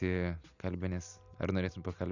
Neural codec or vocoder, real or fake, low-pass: none; real; 7.2 kHz